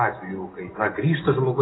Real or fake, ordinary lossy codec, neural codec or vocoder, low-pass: real; AAC, 16 kbps; none; 7.2 kHz